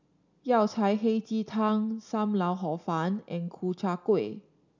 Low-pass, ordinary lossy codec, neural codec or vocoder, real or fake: 7.2 kHz; none; none; real